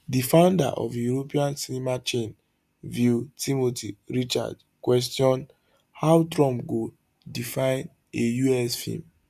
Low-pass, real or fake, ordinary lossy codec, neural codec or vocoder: 14.4 kHz; real; none; none